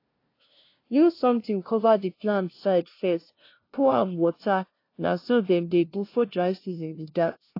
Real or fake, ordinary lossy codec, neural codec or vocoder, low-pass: fake; AAC, 32 kbps; codec, 16 kHz, 0.5 kbps, FunCodec, trained on LibriTTS, 25 frames a second; 5.4 kHz